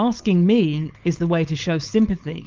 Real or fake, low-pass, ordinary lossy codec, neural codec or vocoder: fake; 7.2 kHz; Opus, 32 kbps; codec, 16 kHz, 4.8 kbps, FACodec